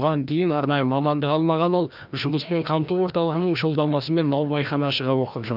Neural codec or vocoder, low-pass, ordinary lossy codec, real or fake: codec, 16 kHz, 1 kbps, FreqCodec, larger model; 5.4 kHz; none; fake